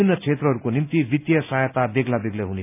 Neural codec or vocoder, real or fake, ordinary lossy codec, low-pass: none; real; none; 3.6 kHz